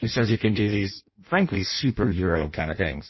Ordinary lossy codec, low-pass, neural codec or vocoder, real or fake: MP3, 24 kbps; 7.2 kHz; codec, 16 kHz in and 24 kHz out, 0.6 kbps, FireRedTTS-2 codec; fake